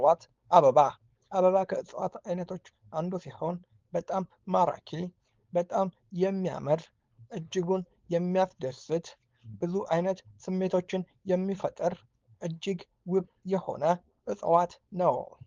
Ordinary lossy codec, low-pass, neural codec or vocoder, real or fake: Opus, 32 kbps; 7.2 kHz; codec, 16 kHz, 4.8 kbps, FACodec; fake